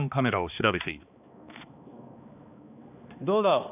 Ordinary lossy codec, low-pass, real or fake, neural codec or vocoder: none; 3.6 kHz; fake; codec, 16 kHz, 2 kbps, X-Codec, HuBERT features, trained on balanced general audio